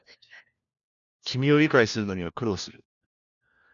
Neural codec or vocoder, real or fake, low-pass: codec, 16 kHz, 1 kbps, FunCodec, trained on LibriTTS, 50 frames a second; fake; 7.2 kHz